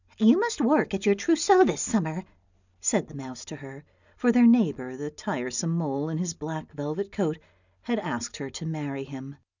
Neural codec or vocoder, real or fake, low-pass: none; real; 7.2 kHz